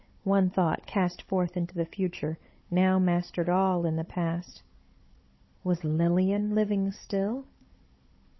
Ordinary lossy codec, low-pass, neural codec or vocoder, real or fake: MP3, 24 kbps; 7.2 kHz; codec, 16 kHz, 16 kbps, FunCodec, trained on Chinese and English, 50 frames a second; fake